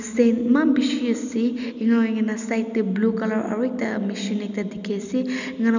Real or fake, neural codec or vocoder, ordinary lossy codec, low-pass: real; none; none; 7.2 kHz